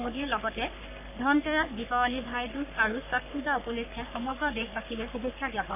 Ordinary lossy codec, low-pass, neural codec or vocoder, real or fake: none; 3.6 kHz; codec, 44.1 kHz, 3.4 kbps, Pupu-Codec; fake